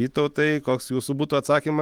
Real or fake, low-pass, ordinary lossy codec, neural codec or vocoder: fake; 19.8 kHz; Opus, 24 kbps; autoencoder, 48 kHz, 128 numbers a frame, DAC-VAE, trained on Japanese speech